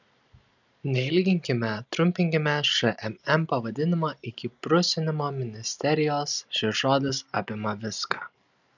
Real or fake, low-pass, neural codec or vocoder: real; 7.2 kHz; none